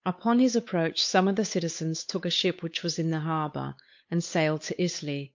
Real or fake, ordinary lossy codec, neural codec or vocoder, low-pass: fake; MP3, 48 kbps; codec, 16 kHz, 8 kbps, FunCodec, trained on Chinese and English, 25 frames a second; 7.2 kHz